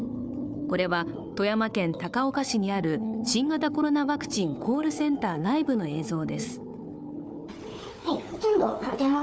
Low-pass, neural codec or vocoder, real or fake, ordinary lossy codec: none; codec, 16 kHz, 4 kbps, FunCodec, trained on Chinese and English, 50 frames a second; fake; none